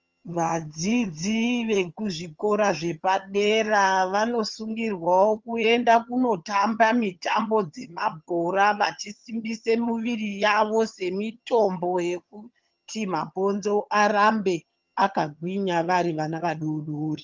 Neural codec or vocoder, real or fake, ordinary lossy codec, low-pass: vocoder, 22.05 kHz, 80 mel bands, HiFi-GAN; fake; Opus, 32 kbps; 7.2 kHz